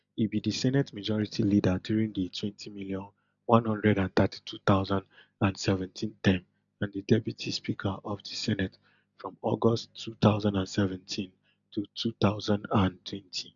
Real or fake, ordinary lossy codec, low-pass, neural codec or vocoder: real; none; 7.2 kHz; none